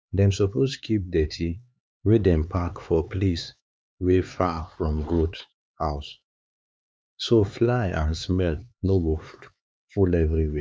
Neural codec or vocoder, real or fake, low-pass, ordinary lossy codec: codec, 16 kHz, 4 kbps, X-Codec, HuBERT features, trained on LibriSpeech; fake; none; none